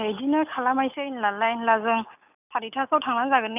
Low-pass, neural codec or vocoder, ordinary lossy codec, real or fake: 3.6 kHz; none; none; real